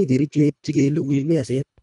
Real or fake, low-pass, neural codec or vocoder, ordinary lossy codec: fake; 10.8 kHz; codec, 24 kHz, 1.5 kbps, HILCodec; none